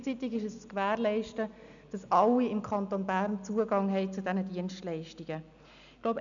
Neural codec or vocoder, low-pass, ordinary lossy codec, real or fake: none; 7.2 kHz; none; real